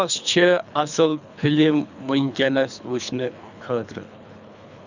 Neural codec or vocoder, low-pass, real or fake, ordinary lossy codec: codec, 24 kHz, 3 kbps, HILCodec; 7.2 kHz; fake; none